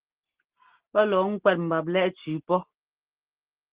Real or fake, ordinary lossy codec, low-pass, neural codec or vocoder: real; Opus, 16 kbps; 3.6 kHz; none